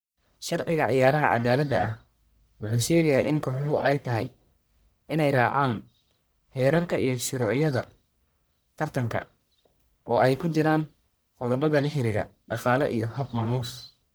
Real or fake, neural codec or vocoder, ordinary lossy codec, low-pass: fake; codec, 44.1 kHz, 1.7 kbps, Pupu-Codec; none; none